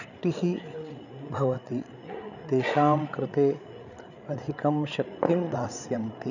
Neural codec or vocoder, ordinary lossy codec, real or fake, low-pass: codec, 16 kHz, 8 kbps, FreqCodec, larger model; none; fake; 7.2 kHz